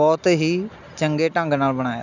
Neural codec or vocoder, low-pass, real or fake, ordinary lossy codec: none; 7.2 kHz; real; none